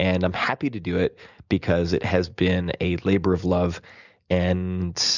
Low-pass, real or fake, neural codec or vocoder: 7.2 kHz; real; none